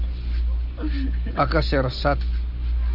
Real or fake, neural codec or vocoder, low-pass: real; none; 5.4 kHz